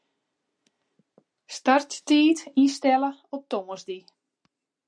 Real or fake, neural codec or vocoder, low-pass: real; none; 9.9 kHz